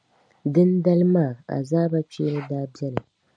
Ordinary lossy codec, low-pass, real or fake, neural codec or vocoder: MP3, 96 kbps; 9.9 kHz; real; none